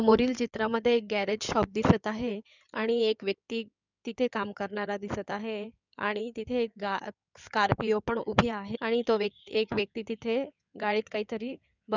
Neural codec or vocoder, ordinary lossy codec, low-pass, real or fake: codec, 16 kHz in and 24 kHz out, 2.2 kbps, FireRedTTS-2 codec; none; 7.2 kHz; fake